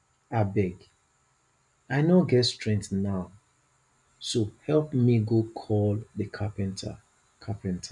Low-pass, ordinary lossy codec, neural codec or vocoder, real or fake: 10.8 kHz; none; none; real